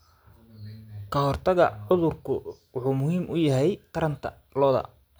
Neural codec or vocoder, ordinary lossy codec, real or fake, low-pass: none; none; real; none